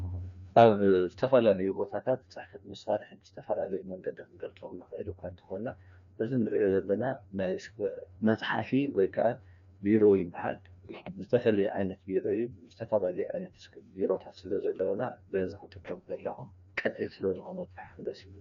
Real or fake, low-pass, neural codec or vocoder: fake; 7.2 kHz; codec, 16 kHz, 1 kbps, FreqCodec, larger model